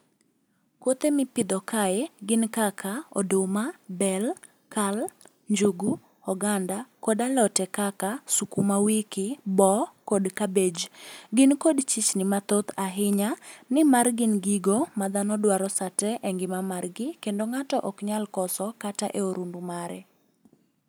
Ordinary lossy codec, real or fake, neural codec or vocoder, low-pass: none; real; none; none